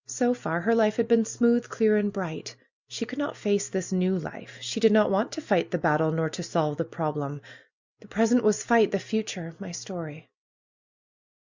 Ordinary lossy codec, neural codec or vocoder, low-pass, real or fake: Opus, 64 kbps; none; 7.2 kHz; real